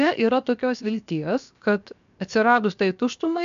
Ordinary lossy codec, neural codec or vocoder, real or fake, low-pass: AAC, 96 kbps; codec, 16 kHz, 0.7 kbps, FocalCodec; fake; 7.2 kHz